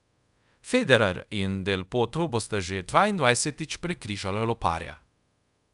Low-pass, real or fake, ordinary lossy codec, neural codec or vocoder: 10.8 kHz; fake; none; codec, 24 kHz, 0.5 kbps, DualCodec